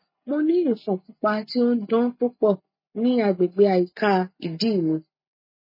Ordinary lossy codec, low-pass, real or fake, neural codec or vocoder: MP3, 24 kbps; 5.4 kHz; real; none